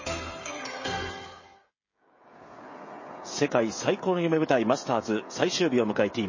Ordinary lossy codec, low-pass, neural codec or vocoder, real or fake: MP3, 32 kbps; 7.2 kHz; codec, 16 kHz, 16 kbps, FreqCodec, smaller model; fake